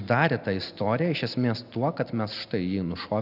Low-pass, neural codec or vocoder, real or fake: 5.4 kHz; none; real